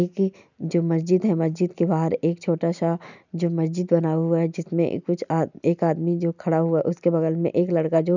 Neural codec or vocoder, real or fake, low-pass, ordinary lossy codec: none; real; 7.2 kHz; none